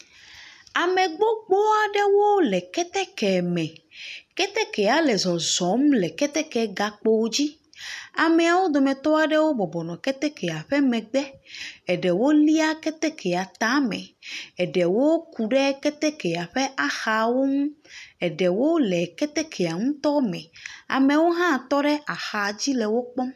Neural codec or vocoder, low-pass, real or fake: none; 14.4 kHz; real